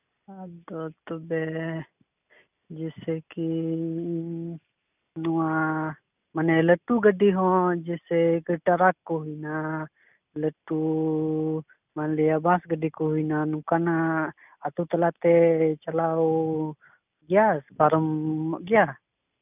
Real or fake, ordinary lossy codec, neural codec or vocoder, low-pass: real; none; none; 3.6 kHz